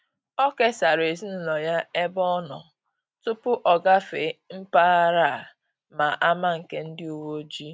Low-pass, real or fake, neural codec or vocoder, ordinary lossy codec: none; real; none; none